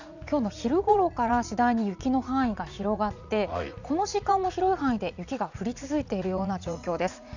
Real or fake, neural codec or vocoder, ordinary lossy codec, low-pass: fake; vocoder, 44.1 kHz, 80 mel bands, Vocos; none; 7.2 kHz